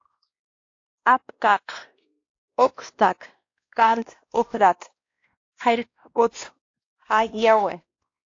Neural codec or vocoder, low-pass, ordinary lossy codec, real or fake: codec, 16 kHz, 1 kbps, X-Codec, WavLM features, trained on Multilingual LibriSpeech; 7.2 kHz; AAC, 48 kbps; fake